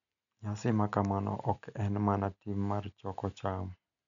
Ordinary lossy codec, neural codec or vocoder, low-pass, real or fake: none; none; 7.2 kHz; real